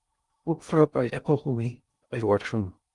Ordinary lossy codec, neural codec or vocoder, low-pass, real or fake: Opus, 32 kbps; codec, 16 kHz in and 24 kHz out, 0.6 kbps, FocalCodec, streaming, 2048 codes; 10.8 kHz; fake